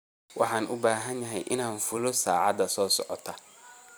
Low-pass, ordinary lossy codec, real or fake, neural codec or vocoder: none; none; fake; vocoder, 44.1 kHz, 128 mel bands every 256 samples, BigVGAN v2